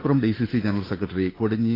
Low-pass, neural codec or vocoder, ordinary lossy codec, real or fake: 5.4 kHz; codec, 16 kHz, 8 kbps, FunCodec, trained on Chinese and English, 25 frames a second; AAC, 24 kbps; fake